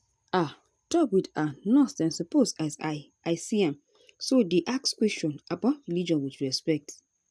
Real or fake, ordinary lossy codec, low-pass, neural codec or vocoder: real; none; none; none